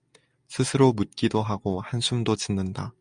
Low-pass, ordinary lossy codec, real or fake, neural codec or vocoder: 9.9 kHz; Opus, 64 kbps; real; none